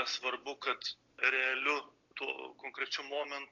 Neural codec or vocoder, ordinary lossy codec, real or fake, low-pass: none; AAC, 48 kbps; real; 7.2 kHz